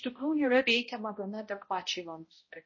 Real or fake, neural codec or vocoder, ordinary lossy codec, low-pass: fake; codec, 16 kHz, 0.5 kbps, X-Codec, HuBERT features, trained on balanced general audio; MP3, 32 kbps; 7.2 kHz